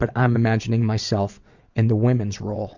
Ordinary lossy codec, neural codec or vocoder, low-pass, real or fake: Opus, 64 kbps; vocoder, 22.05 kHz, 80 mel bands, WaveNeXt; 7.2 kHz; fake